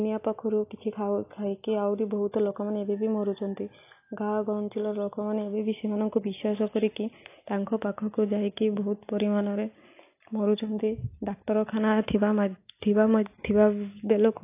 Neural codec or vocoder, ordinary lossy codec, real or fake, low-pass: none; AAC, 24 kbps; real; 3.6 kHz